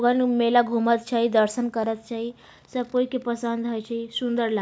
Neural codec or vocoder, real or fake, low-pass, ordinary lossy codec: none; real; none; none